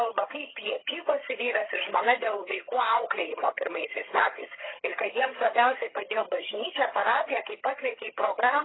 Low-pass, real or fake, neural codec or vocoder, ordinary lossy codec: 7.2 kHz; fake; vocoder, 22.05 kHz, 80 mel bands, HiFi-GAN; AAC, 16 kbps